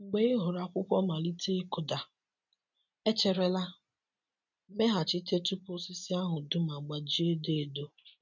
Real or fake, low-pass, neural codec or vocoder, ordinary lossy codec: real; 7.2 kHz; none; none